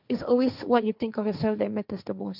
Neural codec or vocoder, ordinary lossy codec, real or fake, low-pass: codec, 16 kHz, 1.1 kbps, Voila-Tokenizer; none; fake; 5.4 kHz